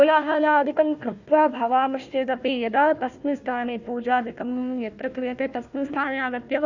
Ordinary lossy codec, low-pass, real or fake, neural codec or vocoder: none; 7.2 kHz; fake; codec, 16 kHz, 1 kbps, FunCodec, trained on Chinese and English, 50 frames a second